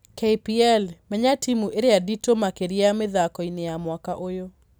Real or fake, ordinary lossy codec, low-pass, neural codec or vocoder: real; none; none; none